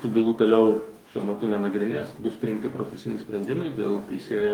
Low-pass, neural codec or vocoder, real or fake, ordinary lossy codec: 19.8 kHz; codec, 44.1 kHz, 2.6 kbps, DAC; fake; Opus, 32 kbps